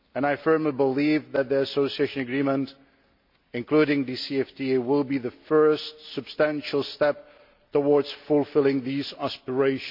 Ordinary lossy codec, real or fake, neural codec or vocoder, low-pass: MP3, 48 kbps; real; none; 5.4 kHz